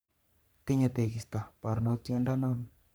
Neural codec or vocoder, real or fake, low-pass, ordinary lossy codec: codec, 44.1 kHz, 3.4 kbps, Pupu-Codec; fake; none; none